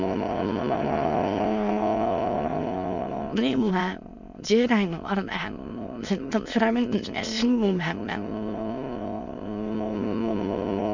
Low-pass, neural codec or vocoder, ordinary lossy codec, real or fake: 7.2 kHz; autoencoder, 22.05 kHz, a latent of 192 numbers a frame, VITS, trained on many speakers; none; fake